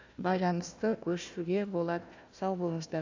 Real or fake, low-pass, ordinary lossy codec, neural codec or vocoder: fake; 7.2 kHz; AAC, 48 kbps; codec, 16 kHz, 1 kbps, FunCodec, trained on Chinese and English, 50 frames a second